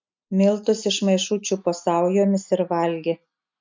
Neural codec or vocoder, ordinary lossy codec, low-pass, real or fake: none; MP3, 64 kbps; 7.2 kHz; real